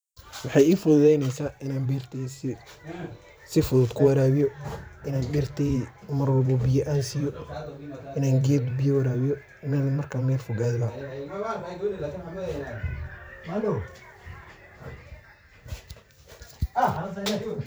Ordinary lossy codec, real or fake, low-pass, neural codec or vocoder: none; fake; none; vocoder, 44.1 kHz, 128 mel bands every 512 samples, BigVGAN v2